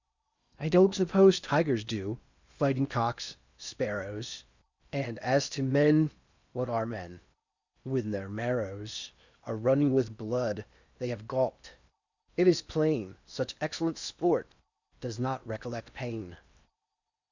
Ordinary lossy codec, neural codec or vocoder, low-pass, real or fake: Opus, 64 kbps; codec, 16 kHz in and 24 kHz out, 0.8 kbps, FocalCodec, streaming, 65536 codes; 7.2 kHz; fake